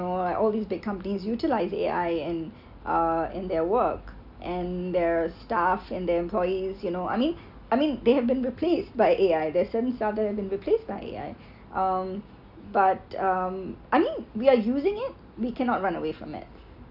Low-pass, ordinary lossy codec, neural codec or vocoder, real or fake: 5.4 kHz; none; none; real